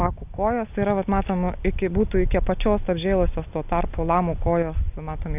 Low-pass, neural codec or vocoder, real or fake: 3.6 kHz; none; real